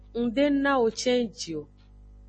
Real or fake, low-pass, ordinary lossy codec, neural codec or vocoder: real; 10.8 kHz; MP3, 32 kbps; none